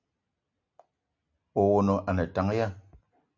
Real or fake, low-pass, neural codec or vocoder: real; 7.2 kHz; none